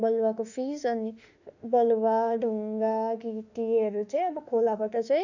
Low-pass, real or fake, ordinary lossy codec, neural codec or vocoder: 7.2 kHz; fake; none; autoencoder, 48 kHz, 32 numbers a frame, DAC-VAE, trained on Japanese speech